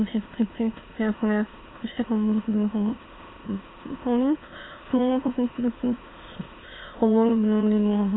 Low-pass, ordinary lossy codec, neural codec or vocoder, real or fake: 7.2 kHz; AAC, 16 kbps; autoencoder, 22.05 kHz, a latent of 192 numbers a frame, VITS, trained on many speakers; fake